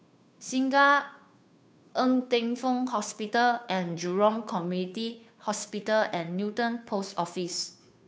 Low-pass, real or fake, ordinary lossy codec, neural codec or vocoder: none; fake; none; codec, 16 kHz, 2 kbps, FunCodec, trained on Chinese and English, 25 frames a second